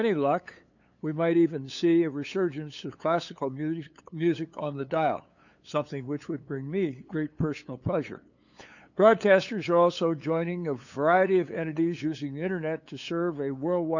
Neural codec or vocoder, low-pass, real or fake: codec, 16 kHz, 8 kbps, FunCodec, trained on LibriTTS, 25 frames a second; 7.2 kHz; fake